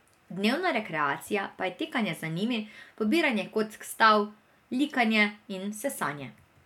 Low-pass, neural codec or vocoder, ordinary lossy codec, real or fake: 19.8 kHz; none; none; real